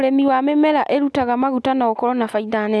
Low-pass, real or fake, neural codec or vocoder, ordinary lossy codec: none; real; none; none